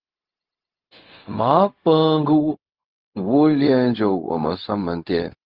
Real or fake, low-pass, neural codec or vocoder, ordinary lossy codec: fake; 5.4 kHz; codec, 16 kHz, 0.4 kbps, LongCat-Audio-Codec; Opus, 32 kbps